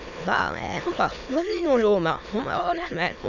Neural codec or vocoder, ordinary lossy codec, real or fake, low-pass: autoencoder, 22.05 kHz, a latent of 192 numbers a frame, VITS, trained on many speakers; none; fake; 7.2 kHz